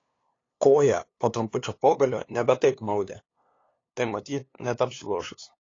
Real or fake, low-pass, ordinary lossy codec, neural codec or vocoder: fake; 7.2 kHz; AAC, 32 kbps; codec, 16 kHz, 2 kbps, FunCodec, trained on LibriTTS, 25 frames a second